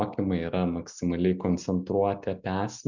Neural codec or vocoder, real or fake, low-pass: none; real; 7.2 kHz